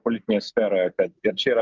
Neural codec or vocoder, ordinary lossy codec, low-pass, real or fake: none; Opus, 24 kbps; 7.2 kHz; real